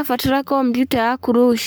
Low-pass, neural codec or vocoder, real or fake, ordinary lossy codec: none; codec, 44.1 kHz, 7.8 kbps, Pupu-Codec; fake; none